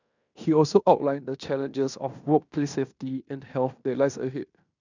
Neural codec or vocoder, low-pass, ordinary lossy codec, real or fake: codec, 16 kHz in and 24 kHz out, 0.9 kbps, LongCat-Audio-Codec, fine tuned four codebook decoder; 7.2 kHz; none; fake